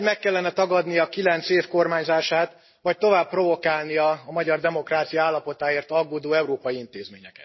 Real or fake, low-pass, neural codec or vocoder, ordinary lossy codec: real; 7.2 kHz; none; MP3, 24 kbps